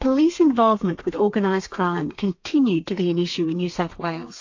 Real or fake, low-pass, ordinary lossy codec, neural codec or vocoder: fake; 7.2 kHz; AAC, 48 kbps; codec, 32 kHz, 1.9 kbps, SNAC